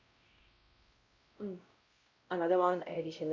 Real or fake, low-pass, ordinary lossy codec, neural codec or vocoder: fake; 7.2 kHz; Opus, 64 kbps; codec, 16 kHz, 0.5 kbps, X-Codec, WavLM features, trained on Multilingual LibriSpeech